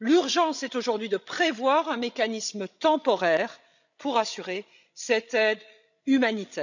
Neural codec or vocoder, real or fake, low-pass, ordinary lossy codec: codec, 16 kHz, 16 kbps, FreqCodec, larger model; fake; 7.2 kHz; none